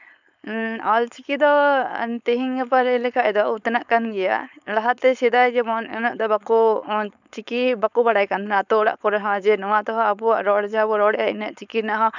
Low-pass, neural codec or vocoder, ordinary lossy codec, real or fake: 7.2 kHz; codec, 16 kHz, 4.8 kbps, FACodec; none; fake